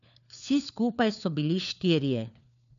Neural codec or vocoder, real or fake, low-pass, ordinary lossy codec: codec, 16 kHz, 4 kbps, FunCodec, trained on LibriTTS, 50 frames a second; fake; 7.2 kHz; none